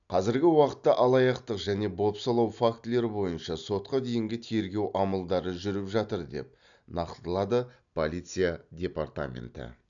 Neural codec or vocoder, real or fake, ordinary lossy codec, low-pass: none; real; none; 7.2 kHz